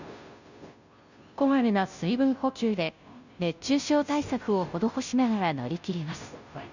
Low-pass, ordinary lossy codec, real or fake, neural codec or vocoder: 7.2 kHz; none; fake; codec, 16 kHz, 0.5 kbps, FunCodec, trained on Chinese and English, 25 frames a second